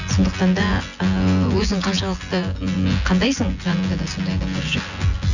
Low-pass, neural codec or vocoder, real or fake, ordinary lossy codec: 7.2 kHz; vocoder, 24 kHz, 100 mel bands, Vocos; fake; none